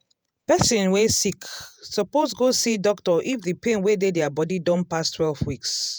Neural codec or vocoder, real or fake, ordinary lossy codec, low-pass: vocoder, 48 kHz, 128 mel bands, Vocos; fake; none; none